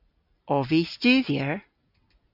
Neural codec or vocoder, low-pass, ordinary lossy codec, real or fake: none; 5.4 kHz; AAC, 48 kbps; real